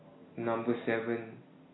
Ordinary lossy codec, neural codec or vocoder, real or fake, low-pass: AAC, 16 kbps; none; real; 7.2 kHz